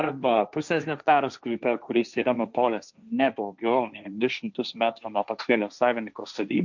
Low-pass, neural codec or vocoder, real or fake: 7.2 kHz; codec, 16 kHz, 1.1 kbps, Voila-Tokenizer; fake